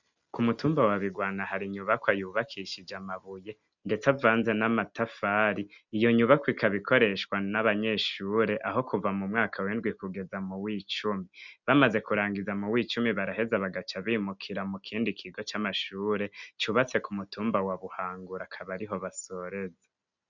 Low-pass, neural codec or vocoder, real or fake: 7.2 kHz; none; real